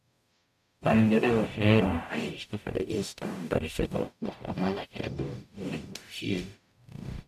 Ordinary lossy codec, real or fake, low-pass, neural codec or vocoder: none; fake; 14.4 kHz; codec, 44.1 kHz, 0.9 kbps, DAC